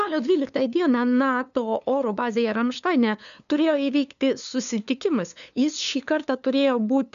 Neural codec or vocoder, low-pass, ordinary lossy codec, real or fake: codec, 16 kHz, 2 kbps, FunCodec, trained on LibriTTS, 25 frames a second; 7.2 kHz; MP3, 96 kbps; fake